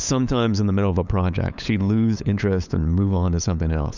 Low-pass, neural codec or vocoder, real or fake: 7.2 kHz; codec, 16 kHz, 8 kbps, FunCodec, trained on LibriTTS, 25 frames a second; fake